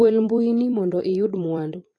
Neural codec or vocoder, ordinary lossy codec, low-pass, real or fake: vocoder, 44.1 kHz, 128 mel bands every 256 samples, BigVGAN v2; AAC, 32 kbps; 10.8 kHz; fake